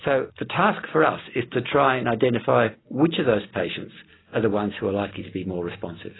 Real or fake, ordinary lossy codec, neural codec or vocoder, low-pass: real; AAC, 16 kbps; none; 7.2 kHz